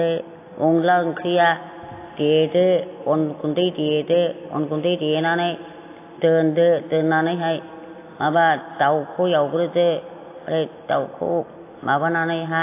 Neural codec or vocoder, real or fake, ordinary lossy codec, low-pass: none; real; AAC, 24 kbps; 3.6 kHz